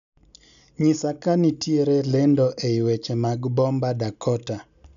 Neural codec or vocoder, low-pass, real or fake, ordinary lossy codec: none; 7.2 kHz; real; none